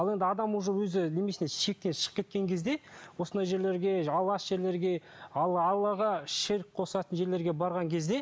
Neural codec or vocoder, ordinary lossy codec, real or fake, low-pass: none; none; real; none